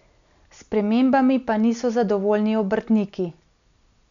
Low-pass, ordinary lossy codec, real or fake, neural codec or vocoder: 7.2 kHz; none; real; none